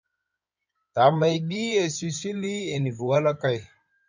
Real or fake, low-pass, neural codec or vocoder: fake; 7.2 kHz; codec, 16 kHz in and 24 kHz out, 2.2 kbps, FireRedTTS-2 codec